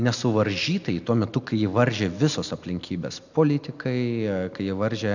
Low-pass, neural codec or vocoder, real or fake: 7.2 kHz; none; real